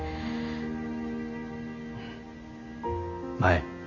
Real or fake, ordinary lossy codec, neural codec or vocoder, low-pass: real; none; none; 7.2 kHz